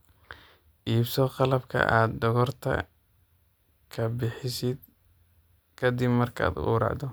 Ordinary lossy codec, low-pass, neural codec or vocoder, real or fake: none; none; none; real